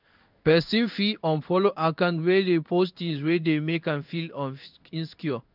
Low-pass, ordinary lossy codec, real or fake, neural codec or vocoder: 5.4 kHz; none; fake; codec, 16 kHz in and 24 kHz out, 1 kbps, XY-Tokenizer